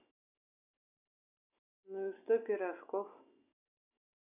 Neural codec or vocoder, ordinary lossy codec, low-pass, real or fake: none; none; 3.6 kHz; real